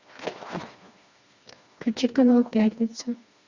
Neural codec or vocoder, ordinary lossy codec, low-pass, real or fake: codec, 16 kHz, 2 kbps, FreqCodec, smaller model; Opus, 64 kbps; 7.2 kHz; fake